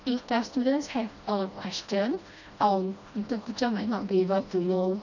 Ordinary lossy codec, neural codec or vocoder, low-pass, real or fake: none; codec, 16 kHz, 1 kbps, FreqCodec, smaller model; 7.2 kHz; fake